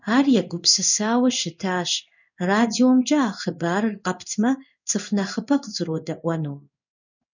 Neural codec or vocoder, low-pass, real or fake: codec, 16 kHz in and 24 kHz out, 1 kbps, XY-Tokenizer; 7.2 kHz; fake